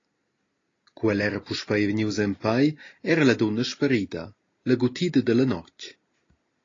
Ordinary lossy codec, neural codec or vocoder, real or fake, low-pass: AAC, 32 kbps; none; real; 7.2 kHz